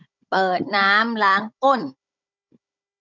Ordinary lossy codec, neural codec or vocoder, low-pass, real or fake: none; codec, 16 kHz, 16 kbps, FunCodec, trained on Chinese and English, 50 frames a second; 7.2 kHz; fake